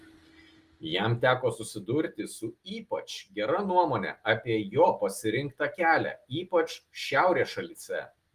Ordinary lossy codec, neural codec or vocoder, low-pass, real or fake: Opus, 24 kbps; none; 14.4 kHz; real